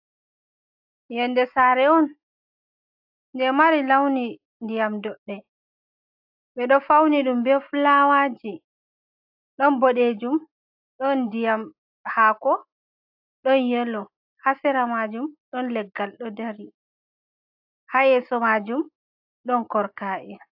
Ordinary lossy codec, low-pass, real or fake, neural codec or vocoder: AAC, 48 kbps; 5.4 kHz; real; none